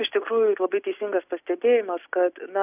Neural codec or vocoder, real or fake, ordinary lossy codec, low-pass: none; real; AAC, 32 kbps; 3.6 kHz